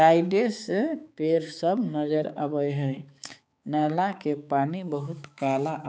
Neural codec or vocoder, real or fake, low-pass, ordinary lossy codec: codec, 16 kHz, 4 kbps, X-Codec, HuBERT features, trained on balanced general audio; fake; none; none